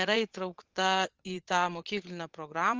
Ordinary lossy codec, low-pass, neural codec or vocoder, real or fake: Opus, 24 kbps; 7.2 kHz; vocoder, 22.05 kHz, 80 mel bands, Vocos; fake